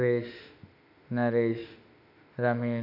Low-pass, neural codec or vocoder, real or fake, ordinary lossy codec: 5.4 kHz; autoencoder, 48 kHz, 32 numbers a frame, DAC-VAE, trained on Japanese speech; fake; none